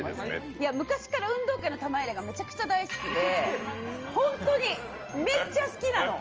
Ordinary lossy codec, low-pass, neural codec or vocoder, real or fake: Opus, 24 kbps; 7.2 kHz; none; real